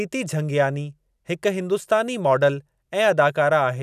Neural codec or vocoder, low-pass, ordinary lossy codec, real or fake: none; 14.4 kHz; none; real